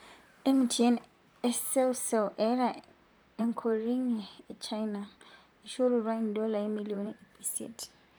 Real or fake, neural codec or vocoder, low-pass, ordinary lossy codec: fake; vocoder, 44.1 kHz, 128 mel bands, Pupu-Vocoder; none; none